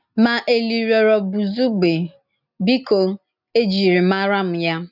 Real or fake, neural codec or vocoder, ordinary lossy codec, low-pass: real; none; none; 5.4 kHz